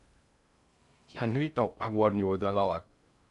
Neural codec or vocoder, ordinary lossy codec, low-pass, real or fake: codec, 16 kHz in and 24 kHz out, 0.6 kbps, FocalCodec, streaming, 2048 codes; MP3, 96 kbps; 10.8 kHz; fake